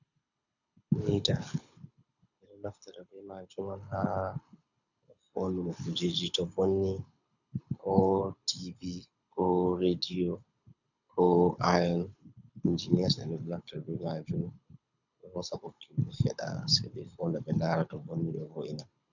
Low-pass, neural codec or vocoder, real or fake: 7.2 kHz; codec, 24 kHz, 6 kbps, HILCodec; fake